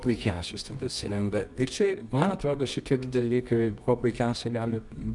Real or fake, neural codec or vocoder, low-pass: fake; codec, 24 kHz, 0.9 kbps, WavTokenizer, medium music audio release; 10.8 kHz